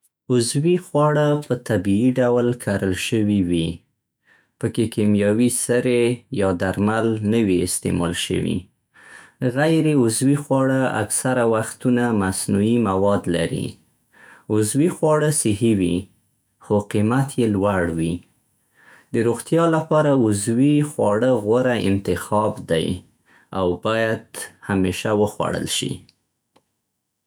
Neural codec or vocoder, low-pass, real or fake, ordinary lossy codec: autoencoder, 48 kHz, 128 numbers a frame, DAC-VAE, trained on Japanese speech; none; fake; none